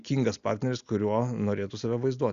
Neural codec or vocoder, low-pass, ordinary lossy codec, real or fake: none; 7.2 kHz; Opus, 64 kbps; real